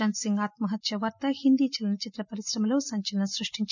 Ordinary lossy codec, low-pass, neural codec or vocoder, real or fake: none; 7.2 kHz; none; real